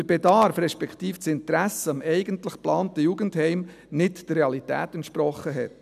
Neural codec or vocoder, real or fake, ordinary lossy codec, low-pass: none; real; none; 14.4 kHz